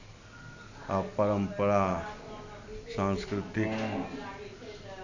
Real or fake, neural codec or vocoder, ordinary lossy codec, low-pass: real; none; none; 7.2 kHz